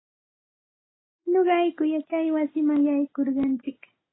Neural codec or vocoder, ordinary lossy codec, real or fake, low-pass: none; AAC, 16 kbps; real; 7.2 kHz